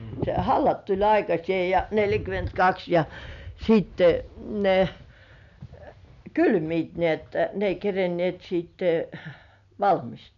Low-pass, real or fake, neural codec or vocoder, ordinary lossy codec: 7.2 kHz; real; none; none